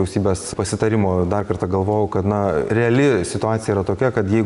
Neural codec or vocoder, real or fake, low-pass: none; real; 10.8 kHz